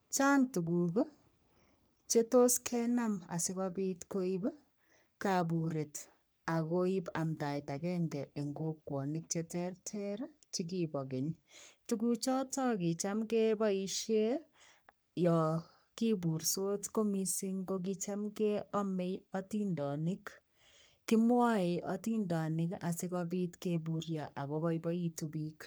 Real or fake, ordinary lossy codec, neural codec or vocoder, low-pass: fake; none; codec, 44.1 kHz, 3.4 kbps, Pupu-Codec; none